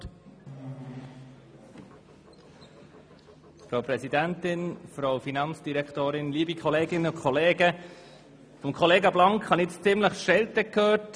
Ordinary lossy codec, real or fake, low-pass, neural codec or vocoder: none; real; none; none